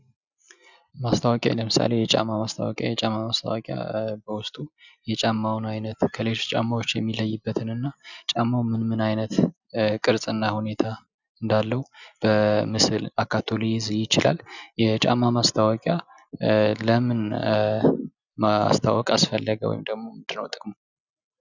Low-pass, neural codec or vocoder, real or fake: 7.2 kHz; none; real